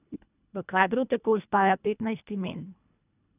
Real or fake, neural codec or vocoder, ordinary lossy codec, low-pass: fake; codec, 24 kHz, 1.5 kbps, HILCodec; none; 3.6 kHz